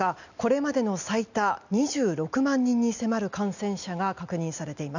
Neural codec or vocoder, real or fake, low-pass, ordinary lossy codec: none; real; 7.2 kHz; none